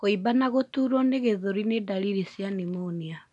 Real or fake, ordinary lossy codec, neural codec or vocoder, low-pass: real; none; none; 10.8 kHz